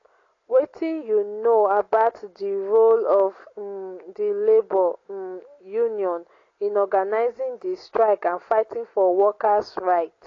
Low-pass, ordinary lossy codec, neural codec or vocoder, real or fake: 7.2 kHz; AAC, 32 kbps; none; real